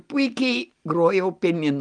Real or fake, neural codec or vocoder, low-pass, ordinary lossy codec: real; none; 9.9 kHz; Opus, 32 kbps